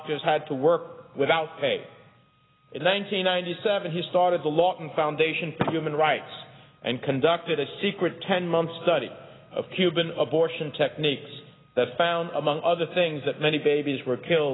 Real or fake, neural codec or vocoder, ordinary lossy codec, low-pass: real; none; AAC, 16 kbps; 7.2 kHz